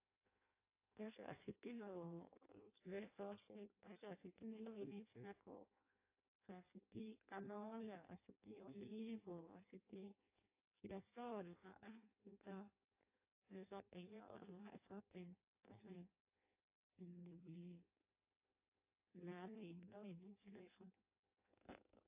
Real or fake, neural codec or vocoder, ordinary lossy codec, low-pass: fake; codec, 16 kHz in and 24 kHz out, 0.6 kbps, FireRedTTS-2 codec; AAC, 24 kbps; 3.6 kHz